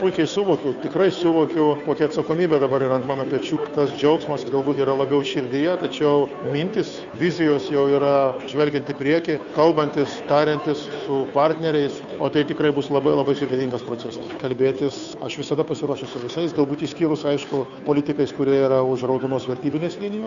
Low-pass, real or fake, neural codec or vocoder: 7.2 kHz; fake; codec, 16 kHz, 2 kbps, FunCodec, trained on Chinese and English, 25 frames a second